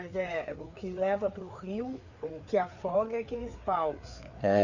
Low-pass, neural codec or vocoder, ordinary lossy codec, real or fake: 7.2 kHz; codec, 16 kHz, 4 kbps, FreqCodec, larger model; none; fake